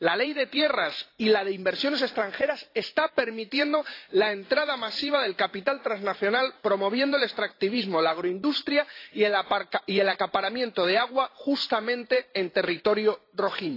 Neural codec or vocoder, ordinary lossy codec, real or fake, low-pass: vocoder, 44.1 kHz, 128 mel bands every 512 samples, BigVGAN v2; AAC, 32 kbps; fake; 5.4 kHz